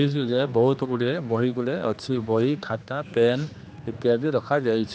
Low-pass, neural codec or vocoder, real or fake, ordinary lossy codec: none; codec, 16 kHz, 2 kbps, X-Codec, HuBERT features, trained on general audio; fake; none